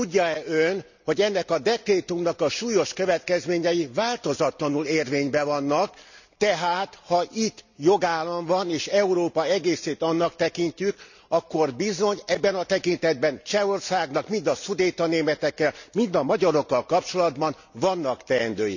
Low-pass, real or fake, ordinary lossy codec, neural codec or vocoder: 7.2 kHz; real; none; none